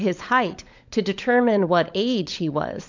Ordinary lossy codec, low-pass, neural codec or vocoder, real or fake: MP3, 64 kbps; 7.2 kHz; codec, 16 kHz, 16 kbps, FunCodec, trained on LibriTTS, 50 frames a second; fake